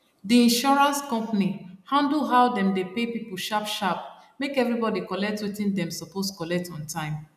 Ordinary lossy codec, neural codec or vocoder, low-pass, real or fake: none; none; 14.4 kHz; real